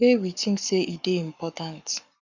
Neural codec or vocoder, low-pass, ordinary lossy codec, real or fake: codec, 44.1 kHz, 7.8 kbps, Pupu-Codec; 7.2 kHz; none; fake